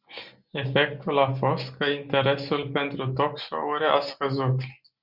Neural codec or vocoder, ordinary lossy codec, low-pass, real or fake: none; Opus, 64 kbps; 5.4 kHz; real